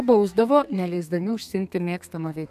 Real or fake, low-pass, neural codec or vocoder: fake; 14.4 kHz; codec, 32 kHz, 1.9 kbps, SNAC